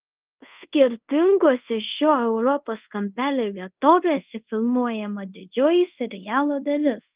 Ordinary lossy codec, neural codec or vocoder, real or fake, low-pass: Opus, 32 kbps; codec, 24 kHz, 0.5 kbps, DualCodec; fake; 3.6 kHz